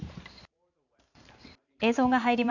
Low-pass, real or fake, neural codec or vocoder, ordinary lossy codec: 7.2 kHz; fake; vocoder, 44.1 kHz, 128 mel bands every 512 samples, BigVGAN v2; none